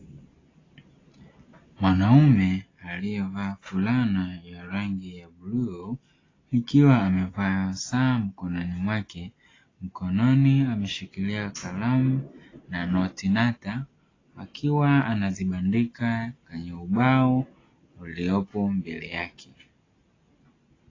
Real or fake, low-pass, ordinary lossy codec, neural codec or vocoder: real; 7.2 kHz; AAC, 32 kbps; none